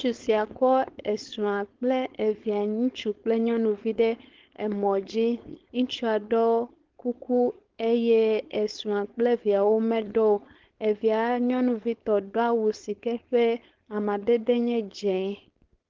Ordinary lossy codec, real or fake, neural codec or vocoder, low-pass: Opus, 16 kbps; fake; codec, 16 kHz, 4.8 kbps, FACodec; 7.2 kHz